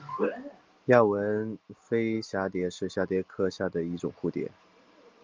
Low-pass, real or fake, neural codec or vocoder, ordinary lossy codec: 7.2 kHz; real; none; Opus, 32 kbps